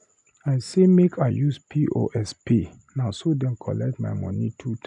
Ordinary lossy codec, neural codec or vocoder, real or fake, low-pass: none; none; real; 10.8 kHz